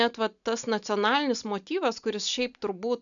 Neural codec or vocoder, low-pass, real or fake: none; 7.2 kHz; real